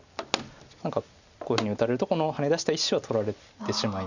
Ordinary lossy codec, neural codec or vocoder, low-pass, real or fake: none; none; 7.2 kHz; real